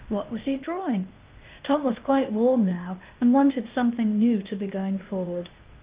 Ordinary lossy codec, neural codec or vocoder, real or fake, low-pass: Opus, 24 kbps; codec, 16 kHz, 0.8 kbps, ZipCodec; fake; 3.6 kHz